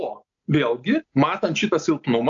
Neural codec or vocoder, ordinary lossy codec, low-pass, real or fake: none; AAC, 64 kbps; 7.2 kHz; real